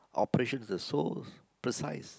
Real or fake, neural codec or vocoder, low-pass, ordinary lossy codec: real; none; none; none